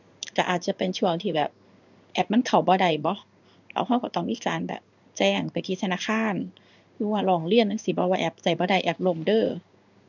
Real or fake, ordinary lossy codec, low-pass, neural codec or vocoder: fake; none; 7.2 kHz; codec, 16 kHz in and 24 kHz out, 1 kbps, XY-Tokenizer